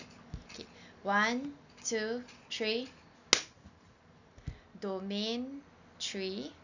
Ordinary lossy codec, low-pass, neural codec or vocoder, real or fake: none; 7.2 kHz; none; real